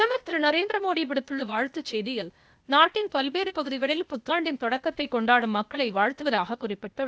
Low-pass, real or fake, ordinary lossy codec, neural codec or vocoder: none; fake; none; codec, 16 kHz, 0.8 kbps, ZipCodec